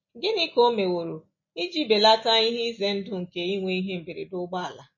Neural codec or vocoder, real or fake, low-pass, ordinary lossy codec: none; real; 7.2 kHz; MP3, 32 kbps